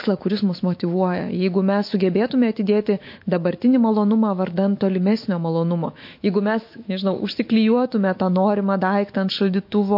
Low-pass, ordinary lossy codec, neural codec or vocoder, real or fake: 5.4 kHz; MP3, 32 kbps; autoencoder, 48 kHz, 128 numbers a frame, DAC-VAE, trained on Japanese speech; fake